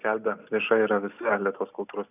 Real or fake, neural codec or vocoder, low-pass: real; none; 3.6 kHz